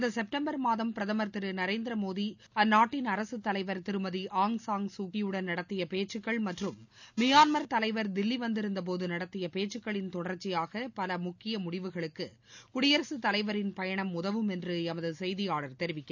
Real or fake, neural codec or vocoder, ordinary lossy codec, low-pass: real; none; none; 7.2 kHz